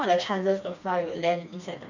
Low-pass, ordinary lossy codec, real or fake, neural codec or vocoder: 7.2 kHz; none; fake; codec, 16 kHz, 2 kbps, FreqCodec, smaller model